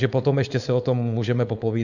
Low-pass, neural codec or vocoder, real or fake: 7.2 kHz; codec, 16 kHz, 4.8 kbps, FACodec; fake